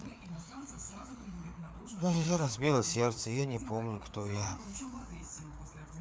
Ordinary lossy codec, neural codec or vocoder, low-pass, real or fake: none; codec, 16 kHz, 4 kbps, FreqCodec, larger model; none; fake